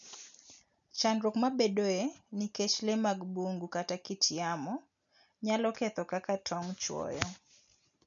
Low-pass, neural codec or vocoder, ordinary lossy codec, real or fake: 7.2 kHz; none; none; real